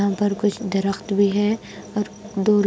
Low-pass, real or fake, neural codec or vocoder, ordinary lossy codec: none; real; none; none